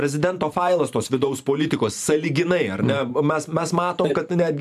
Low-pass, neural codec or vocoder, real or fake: 14.4 kHz; vocoder, 44.1 kHz, 128 mel bands every 512 samples, BigVGAN v2; fake